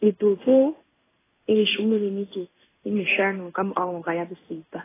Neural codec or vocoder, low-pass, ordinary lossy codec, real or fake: codec, 16 kHz in and 24 kHz out, 1 kbps, XY-Tokenizer; 3.6 kHz; AAC, 16 kbps; fake